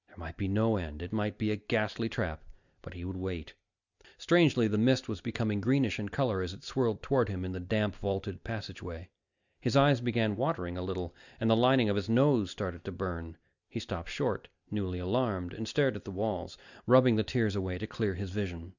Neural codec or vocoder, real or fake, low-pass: none; real; 7.2 kHz